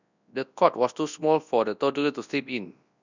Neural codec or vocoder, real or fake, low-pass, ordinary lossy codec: codec, 24 kHz, 0.9 kbps, WavTokenizer, large speech release; fake; 7.2 kHz; none